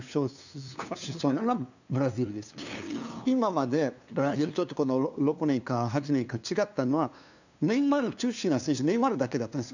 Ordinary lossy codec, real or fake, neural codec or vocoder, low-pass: none; fake; codec, 16 kHz, 2 kbps, FunCodec, trained on LibriTTS, 25 frames a second; 7.2 kHz